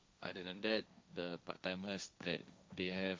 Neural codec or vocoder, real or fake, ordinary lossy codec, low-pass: codec, 16 kHz, 1.1 kbps, Voila-Tokenizer; fake; none; none